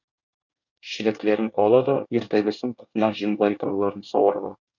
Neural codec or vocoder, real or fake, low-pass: codec, 24 kHz, 1 kbps, SNAC; fake; 7.2 kHz